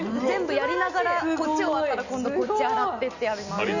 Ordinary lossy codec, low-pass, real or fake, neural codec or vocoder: none; 7.2 kHz; real; none